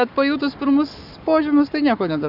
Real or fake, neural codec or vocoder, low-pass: fake; codec, 44.1 kHz, 7.8 kbps, Pupu-Codec; 5.4 kHz